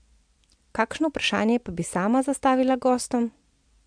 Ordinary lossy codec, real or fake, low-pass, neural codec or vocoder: MP3, 64 kbps; real; 9.9 kHz; none